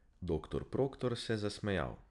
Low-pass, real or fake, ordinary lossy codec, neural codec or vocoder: 10.8 kHz; fake; none; vocoder, 44.1 kHz, 128 mel bands every 256 samples, BigVGAN v2